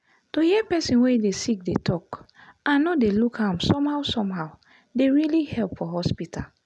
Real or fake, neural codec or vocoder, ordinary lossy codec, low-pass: real; none; none; none